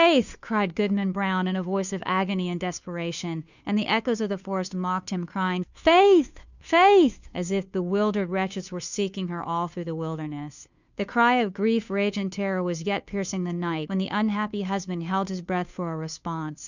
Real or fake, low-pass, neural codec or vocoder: fake; 7.2 kHz; codec, 16 kHz, 2 kbps, FunCodec, trained on Chinese and English, 25 frames a second